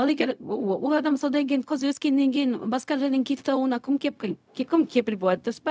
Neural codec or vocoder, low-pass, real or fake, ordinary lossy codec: codec, 16 kHz, 0.4 kbps, LongCat-Audio-Codec; none; fake; none